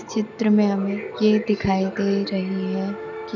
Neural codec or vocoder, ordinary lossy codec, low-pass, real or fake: none; none; 7.2 kHz; real